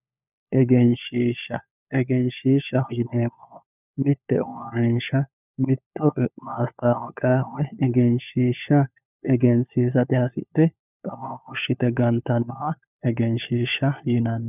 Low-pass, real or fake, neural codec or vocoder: 3.6 kHz; fake; codec, 16 kHz, 4 kbps, FunCodec, trained on LibriTTS, 50 frames a second